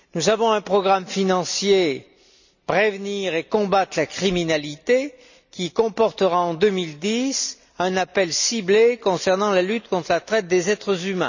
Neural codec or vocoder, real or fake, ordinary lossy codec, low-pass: none; real; none; 7.2 kHz